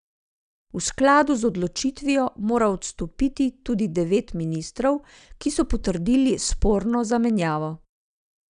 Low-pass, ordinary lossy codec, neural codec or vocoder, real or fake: 9.9 kHz; none; none; real